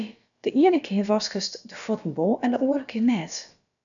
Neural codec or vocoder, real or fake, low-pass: codec, 16 kHz, about 1 kbps, DyCAST, with the encoder's durations; fake; 7.2 kHz